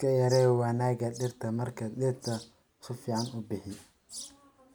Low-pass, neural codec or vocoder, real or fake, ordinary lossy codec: none; none; real; none